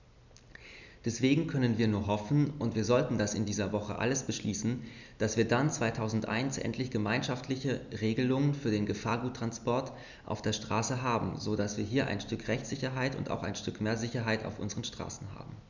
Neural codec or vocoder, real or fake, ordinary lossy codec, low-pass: none; real; none; 7.2 kHz